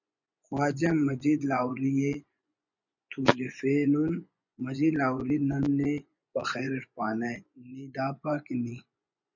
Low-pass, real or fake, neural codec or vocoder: 7.2 kHz; fake; vocoder, 24 kHz, 100 mel bands, Vocos